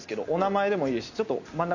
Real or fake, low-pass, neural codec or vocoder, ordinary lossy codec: real; 7.2 kHz; none; none